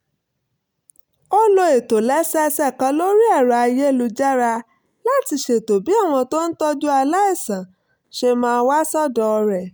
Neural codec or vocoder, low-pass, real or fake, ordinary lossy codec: none; none; real; none